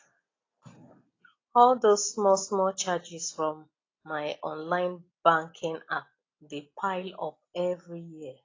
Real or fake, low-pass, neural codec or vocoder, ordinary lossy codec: real; 7.2 kHz; none; AAC, 32 kbps